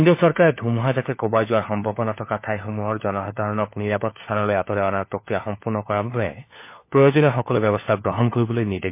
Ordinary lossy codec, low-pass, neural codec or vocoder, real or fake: MP3, 24 kbps; 3.6 kHz; codec, 16 kHz, 4 kbps, FunCodec, trained on LibriTTS, 50 frames a second; fake